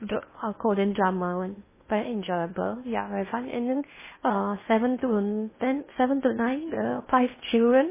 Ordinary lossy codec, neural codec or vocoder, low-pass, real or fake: MP3, 16 kbps; codec, 16 kHz in and 24 kHz out, 0.6 kbps, FocalCodec, streaming, 2048 codes; 3.6 kHz; fake